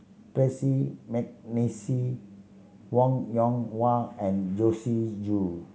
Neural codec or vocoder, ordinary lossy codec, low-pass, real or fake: none; none; none; real